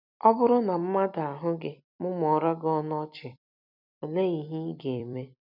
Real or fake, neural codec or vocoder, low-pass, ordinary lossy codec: fake; vocoder, 44.1 kHz, 128 mel bands every 256 samples, BigVGAN v2; 5.4 kHz; none